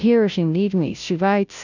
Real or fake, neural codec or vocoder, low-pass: fake; codec, 16 kHz, 0.5 kbps, FunCodec, trained on Chinese and English, 25 frames a second; 7.2 kHz